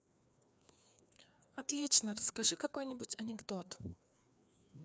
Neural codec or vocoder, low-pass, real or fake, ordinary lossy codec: codec, 16 kHz, 2 kbps, FreqCodec, larger model; none; fake; none